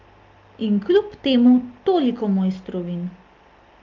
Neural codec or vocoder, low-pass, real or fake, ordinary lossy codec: none; 7.2 kHz; real; Opus, 32 kbps